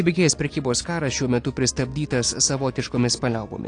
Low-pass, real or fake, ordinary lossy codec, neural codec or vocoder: 9.9 kHz; real; AAC, 48 kbps; none